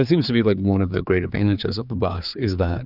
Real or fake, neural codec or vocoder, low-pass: fake; codec, 16 kHz, 4 kbps, X-Codec, HuBERT features, trained on general audio; 5.4 kHz